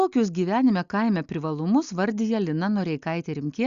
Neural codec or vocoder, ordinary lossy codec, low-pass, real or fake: none; Opus, 64 kbps; 7.2 kHz; real